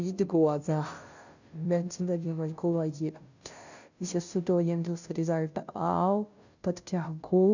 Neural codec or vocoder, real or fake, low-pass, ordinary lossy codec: codec, 16 kHz, 0.5 kbps, FunCodec, trained on Chinese and English, 25 frames a second; fake; 7.2 kHz; none